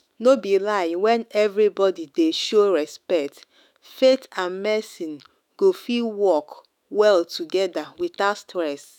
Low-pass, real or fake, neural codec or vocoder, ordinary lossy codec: 19.8 kHz; fake; autoencoder, 48 kHz, 128 numbers a frame, DAC-VAE, trained on Japanese speech; none